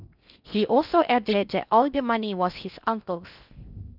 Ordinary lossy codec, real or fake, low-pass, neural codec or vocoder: none; fake; 5.4 kHz; codec, 16 kHz in and 24 kHz out, 0.6 kbps, FocalCodec, streaming, 4096 codes